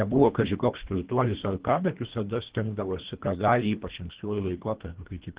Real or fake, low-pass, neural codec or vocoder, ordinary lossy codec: fake; 3.6 kHz; codec, 24 kHz, 1.5 kbps, HILCodec; Opus, 16 kbps